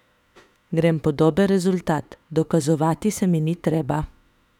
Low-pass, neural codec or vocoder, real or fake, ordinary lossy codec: 19.8 kHz; autoencoder, 48 kHz, 32 numbers a frame, DAC-VAE, trained on Japanese speech; fake; none